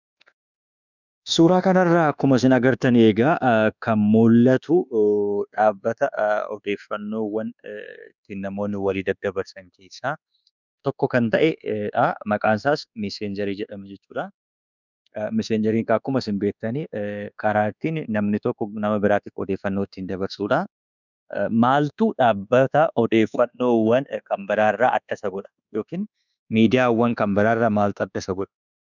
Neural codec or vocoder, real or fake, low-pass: codec, 24 kHz, 1.2 kbps, DualCodec; fake; 7.2 kHz